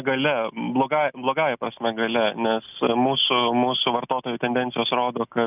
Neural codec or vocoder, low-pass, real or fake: none; 3.6 kHz; real